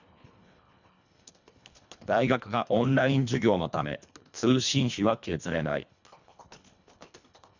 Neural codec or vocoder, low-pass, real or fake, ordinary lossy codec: codec, 24 kHz, 1.5 kbps, HILCodec; 7.2 kHz; fake; none